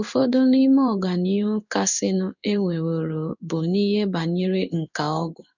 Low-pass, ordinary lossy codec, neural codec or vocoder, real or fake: 7.2 kHz; none; codec, 16 kHz in and 24 kHz out, 1 kbps, XY-Tokenizer; fake